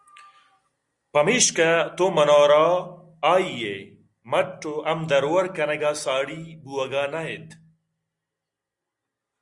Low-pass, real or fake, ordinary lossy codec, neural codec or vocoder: 10.8 kHz; real; Opus, 64 kbps; none